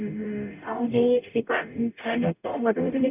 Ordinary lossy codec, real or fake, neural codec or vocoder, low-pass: none; fake; codec, 44.1 kHz, 0.9 kbps, DAC; 3.6 kHz